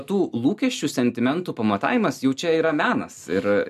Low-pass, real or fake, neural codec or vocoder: 14.4 kHz; real; none